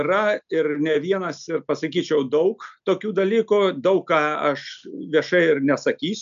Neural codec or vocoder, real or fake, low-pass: none; real; 7.2 kHz